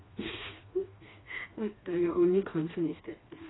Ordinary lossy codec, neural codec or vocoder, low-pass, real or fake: AAC, 16 kbps; codec, 16 kHz, 2 kbps, FreqCodec, smaller model; 7.2 kHz; fake